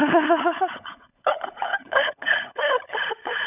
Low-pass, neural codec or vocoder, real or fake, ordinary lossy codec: 3.6 kHz; codec, 16 kHz, 16 kbps, FunCodec, trained on LibriTTS, 50 frames a second; fake; none